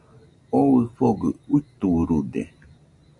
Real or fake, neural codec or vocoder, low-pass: real; none; 10.8 kHz